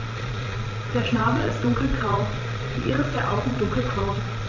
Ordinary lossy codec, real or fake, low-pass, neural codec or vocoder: AAC, 48 kbps; fake; 7.2 kHz; vocoder, 22.05 kHz, 80 mel bands, Vocos